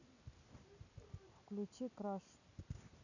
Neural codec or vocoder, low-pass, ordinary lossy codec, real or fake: none; 7.2 kHz; none; real